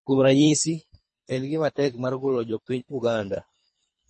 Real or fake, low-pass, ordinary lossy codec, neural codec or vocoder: fake; 10.8 kHz; MP3, 32 kbps; codec, 24 kHz, 3 kbps, HILCodec